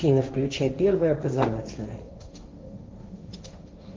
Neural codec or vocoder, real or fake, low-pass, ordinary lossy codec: codec, 16 kHz, 1.1 kbps, Voila-Tokenizer; fake; 7.2 kHz; Opus, 32 kbps